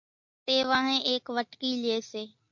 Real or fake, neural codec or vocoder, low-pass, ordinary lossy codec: real; none; 7.2 kHz; MP3, 48 kbps